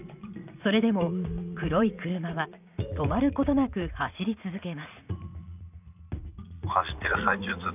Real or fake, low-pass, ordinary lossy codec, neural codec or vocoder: fake; 3.6 kHz; none; vocoder, 44.1 kHz, 80 mel bands, Vocos